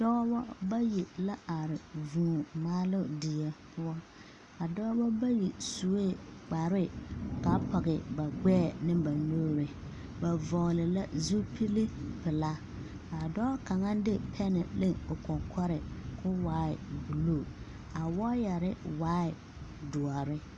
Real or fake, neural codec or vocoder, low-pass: real; none; 10.8 kHz